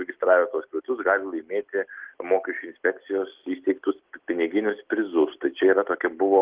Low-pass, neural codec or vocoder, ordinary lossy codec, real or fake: 3.6 kHz; none; Opus, 16 kbps; real